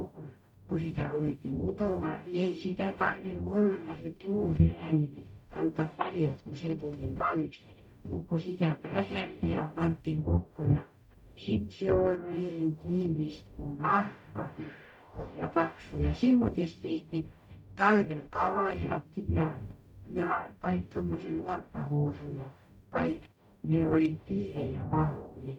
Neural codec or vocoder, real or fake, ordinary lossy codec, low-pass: codec, 44.1 kHz, 0.9 kbps, DAC; fake; none; 19.8 kHz